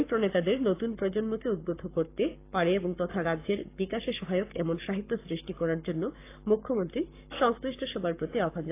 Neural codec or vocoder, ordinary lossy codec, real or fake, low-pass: codec, 44.1 kHz, 7.8 kbps, Pupu-Codec; AAC, 24 kbps; fake; 3.6 kHz